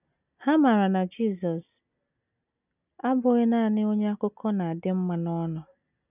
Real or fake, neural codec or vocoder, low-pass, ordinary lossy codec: real; none; 3.6 kHz; none